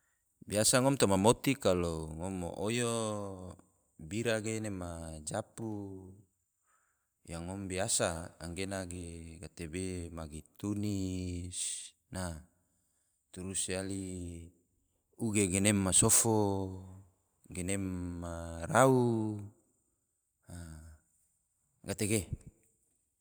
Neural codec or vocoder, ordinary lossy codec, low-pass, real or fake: vocoder, 44.1 kHz, 128 mel bands every 512 samples, BigVGAN v2; none; none; fake